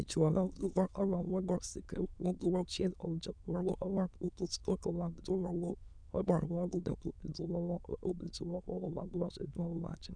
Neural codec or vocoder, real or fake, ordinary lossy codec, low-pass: autoencoder, 22.05 kHz, a latent of 192 numbers a frame, VITS, trained on many speakers; fake; none; 9.9 kHz